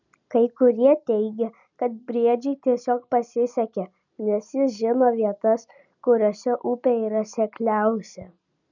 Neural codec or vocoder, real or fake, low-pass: none; real; 7.2 kHz